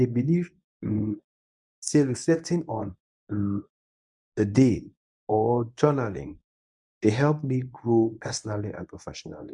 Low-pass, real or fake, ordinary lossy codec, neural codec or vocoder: 10.8 kHz; fake; none; codec, 24 kHz, 0.9 kbps, WavTokenizer, medium speech release version 1